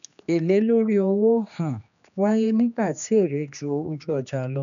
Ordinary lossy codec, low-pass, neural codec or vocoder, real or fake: none; 7.2 kHz; codec, 16 kHz, 2 kbps, X-Codec, HuBERT features, trained on general audio; fake